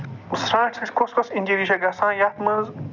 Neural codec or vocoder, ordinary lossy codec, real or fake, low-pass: none; none; real; 7.2 kHz